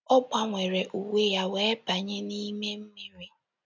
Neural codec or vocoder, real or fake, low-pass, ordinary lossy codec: none; real; 7.2 kHz; none